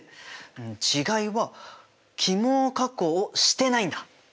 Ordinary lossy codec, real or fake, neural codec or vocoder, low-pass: none; real; none; none